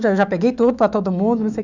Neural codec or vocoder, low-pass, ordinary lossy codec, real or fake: autoencoder, 48 kHz, 128 numbers a frame, DAC-VAE, trained on Japanese speech; 7.2 kHz; none; fake